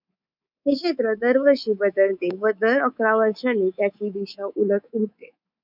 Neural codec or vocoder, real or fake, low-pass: codec, 24 kHz, 3.1 kbps, DualCodec; fake; 5.4 kHz